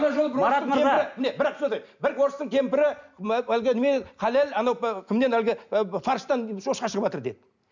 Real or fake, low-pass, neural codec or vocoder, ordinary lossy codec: real; 7.2 kHz; none; none